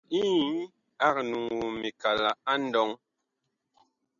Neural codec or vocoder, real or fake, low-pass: none; real; 7.2 kHz